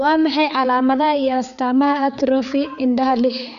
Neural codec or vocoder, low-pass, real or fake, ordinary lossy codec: codec, 16 kHz, 4 kbps, X-Codec, HuBERT features, trained on balanced general audio; 7.2 kHz; fake; none